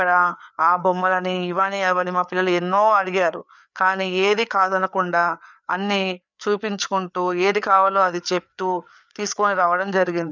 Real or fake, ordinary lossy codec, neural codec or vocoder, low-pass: fake; none; codec, 16 kHz, 4 kbps, FreqCodec, larger model; 7.2 kHz